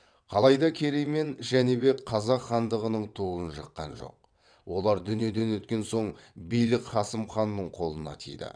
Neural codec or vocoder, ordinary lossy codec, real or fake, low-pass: vocoder, 22.05 kHz, 80 mel bands, WaveNeXt; none; fake; 9.9 kHz